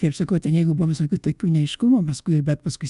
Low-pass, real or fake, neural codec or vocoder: 10.8 kHz; fake; codec, 24 kHz, 1.2 kbps, DualCodec